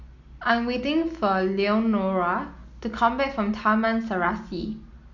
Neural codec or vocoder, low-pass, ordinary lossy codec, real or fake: none; 7.2 kHz; none; real